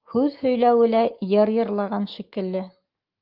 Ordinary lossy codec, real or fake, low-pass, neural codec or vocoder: Opus, 32 kbps; fake; 5.4 kHz; autoencoder, 48 kHz, 128 numbers a frame, DAC-VAE, trained on Japanese speech